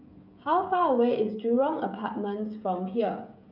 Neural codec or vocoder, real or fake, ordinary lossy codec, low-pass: codec, 16 kHz, 16 kbps, FreqCodec, smaller model; fake; none; 5.4 kHz